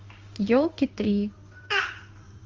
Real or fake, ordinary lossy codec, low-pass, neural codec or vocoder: fake; Opus, 32 kbps; 7.2 kHz; codec, 16 kHz in and 24 kHz out, 1 kbps, XY-Tokenizer